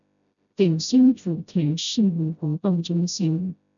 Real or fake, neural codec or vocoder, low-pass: fake; codec, 16 kHz, 0.5 kbps, FreqCodec, smaller model; 7.2 kHz